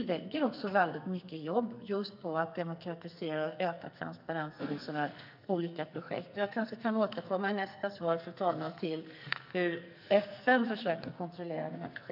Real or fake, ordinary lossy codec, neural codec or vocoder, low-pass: fake; none; codec, 44.1 kHz, 2.6 kbps, SNAC; 5.4 kHz